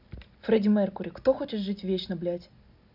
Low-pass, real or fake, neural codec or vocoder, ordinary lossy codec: 5.4 kHz; real; none; none